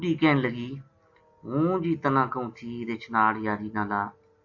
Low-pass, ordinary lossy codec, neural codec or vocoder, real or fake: 7.2 kHz; AAC, 48 kbps; none; real